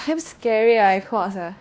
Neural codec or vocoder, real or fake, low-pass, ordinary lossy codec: codec, 16 kHz, 1 kbps, X-Codec, WavLM features, trained on Multilingual LibriSpeech; fake; none; none